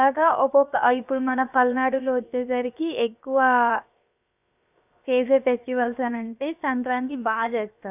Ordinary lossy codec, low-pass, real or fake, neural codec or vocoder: none; 3.6 kHz; fake; codec, 16 kHz, 0.7 kbps, FocalCodec